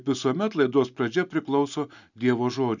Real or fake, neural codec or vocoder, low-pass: real; none; 7.2 kHz